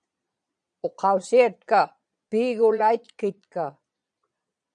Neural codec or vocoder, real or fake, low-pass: vocoder, 22.05 kHz, 80 mel bands, Vocos; fake; 9.9 kHz